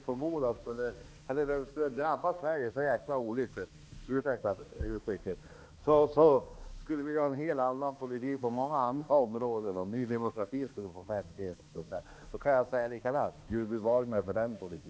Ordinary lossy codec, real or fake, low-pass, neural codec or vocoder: none; fake; none; codec, 16 kHz, 2 kbps, X-Codec, HuBERT features, trained on balanced general audio